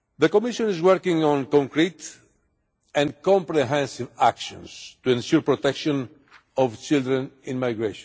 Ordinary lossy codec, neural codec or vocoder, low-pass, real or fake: none; none; none; real